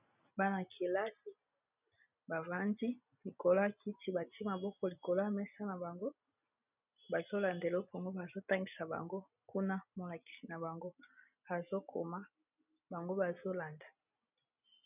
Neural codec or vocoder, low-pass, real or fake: none; 3.6 kHz; real